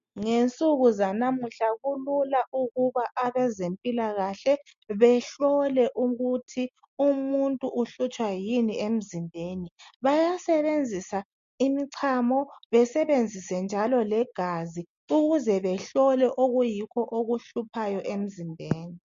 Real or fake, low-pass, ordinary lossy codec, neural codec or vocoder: real; 7.2 kHz; MP3, 48 kbps; none